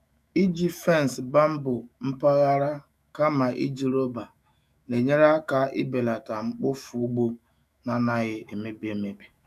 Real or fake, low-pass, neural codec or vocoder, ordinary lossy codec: fake; 14.4 kHz; autoencoder, 48 kHz, 128 numbers a frame, DAC-VAE, trained on Japanese speech; none